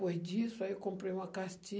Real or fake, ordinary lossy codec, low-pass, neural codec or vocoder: real; none; none; none